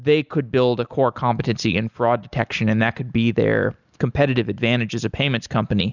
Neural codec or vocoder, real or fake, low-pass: none; real; 7.2 kHz